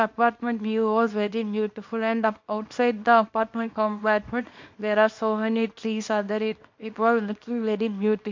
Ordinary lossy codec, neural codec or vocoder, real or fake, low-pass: MP3, 48 kbps; codec, 24 kHz, 0.9 kbps, WavTokenizer, small release; fake; 7.2 kHz